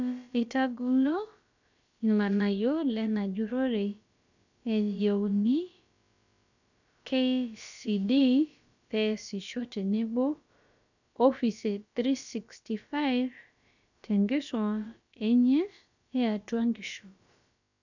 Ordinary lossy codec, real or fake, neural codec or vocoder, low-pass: none; fake; codec, 16 kHz, about 1 kbps, DyCAST, with the encoder's durations; 7.2 kHz